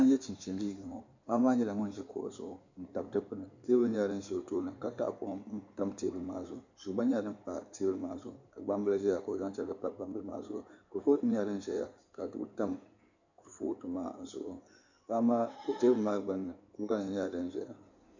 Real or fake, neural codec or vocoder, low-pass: fake; codec, 16 kHz in and 24 kHz out, 2.2 kbps, FireRedTTS-2 codec; 7.2 kHz